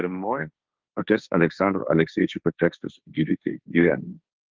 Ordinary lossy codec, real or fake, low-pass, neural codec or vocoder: Opus, 32 kbps; fake; 7.2 kHz; codec, 16 kHz, 1.1 kbps, Voila-Tokenizer